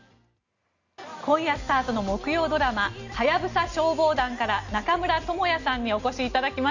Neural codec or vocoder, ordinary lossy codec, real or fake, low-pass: none; none; real; 7.2 kHz